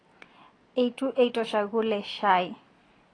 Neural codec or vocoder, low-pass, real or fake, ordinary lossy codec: none; 9.9 kHz; real; AAC, 32 kbps